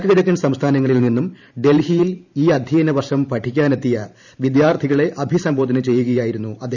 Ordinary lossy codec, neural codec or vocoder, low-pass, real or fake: none; vocoder, 44.1 kHz, 128 mel bands every 512 samples, BigVGAN v2; 7.2 kHz; fake